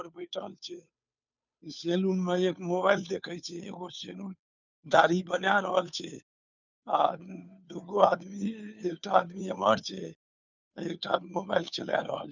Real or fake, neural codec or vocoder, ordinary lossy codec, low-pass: fake; codec, 16 kHz, 2 kbps, FunCodec, trained on Chinese and English, 25 frames a second; none; 7.2 kHz